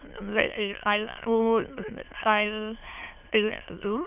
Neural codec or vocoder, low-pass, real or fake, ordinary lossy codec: autoencoder, 22.05 kHz, a latent of 192 numbers a frame, VITS, trained on many speakers; 3.6 kHz; fake; none